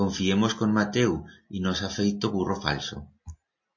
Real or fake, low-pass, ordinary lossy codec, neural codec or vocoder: real; 7.2 kHz; MP3, 32 kbps; none